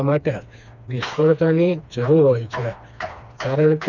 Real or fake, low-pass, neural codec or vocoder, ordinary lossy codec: fake; 7.2 kHz; codec, 16 kHz, 2 kbps, FreqCodec, smaller model; none